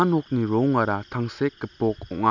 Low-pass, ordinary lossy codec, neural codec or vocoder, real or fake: 7.2 kHz; none; none; real